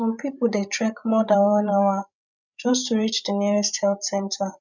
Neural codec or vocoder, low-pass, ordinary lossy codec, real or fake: codec, 16 kHz, 16 kbps, FreqCodec, larger model; 7.2 kHz; none; fake